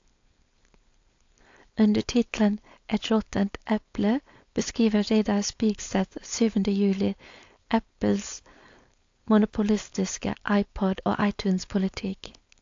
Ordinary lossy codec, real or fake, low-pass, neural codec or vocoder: AAC, 48 kbps; fake; 7.2 kHz; codec, 16 kHz, 4.8 kbps, FACodec